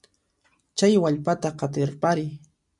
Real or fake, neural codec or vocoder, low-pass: real; none; 10.8 kHz